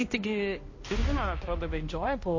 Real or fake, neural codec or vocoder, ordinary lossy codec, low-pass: fake; codec, 16 kHz, 1 kbps, X-Codec, HuBERT features, trained on balanced general audio; MP3, 32 kbps; 7.2 kHz